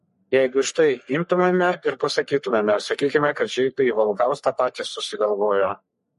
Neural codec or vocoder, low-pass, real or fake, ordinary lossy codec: codec, 44.1 kHz, 3.4 kbps, Pupu-Codec; 14.4 kHz; fake; MP3, 48 kbps